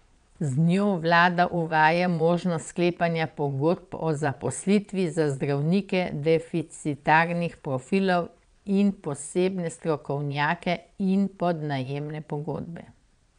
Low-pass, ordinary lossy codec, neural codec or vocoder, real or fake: 9.9 kHz; none; vocoder, 22.05 kHz, 80 mel bands, Vocos; fake